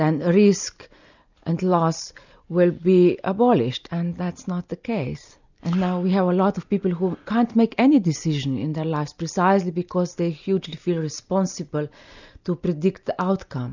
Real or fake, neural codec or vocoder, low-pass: real; none; 7.2 kHz